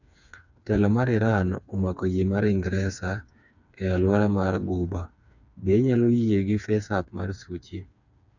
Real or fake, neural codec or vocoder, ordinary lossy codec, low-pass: fake; codec, 16 kHz, 4 kbps, FreqCodec, smaller model; none; 7.2 kHz